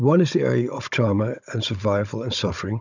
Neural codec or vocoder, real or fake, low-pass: codec, 16 kHz, 16 kbps, FunCodec, trained on Chinese and English, 50 frames a second; fake; 7.2 kHz